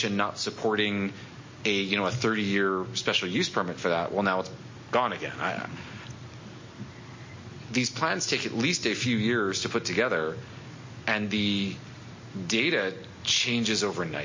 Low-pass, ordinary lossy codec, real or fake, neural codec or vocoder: 7.2 kHz; MP3, 32 kbps; real; none